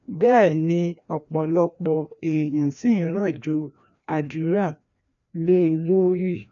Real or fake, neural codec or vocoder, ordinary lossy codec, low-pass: fake; codec, 16 kHz, 1 kbps, FreqCodec, larger model; none; 7.2 kHz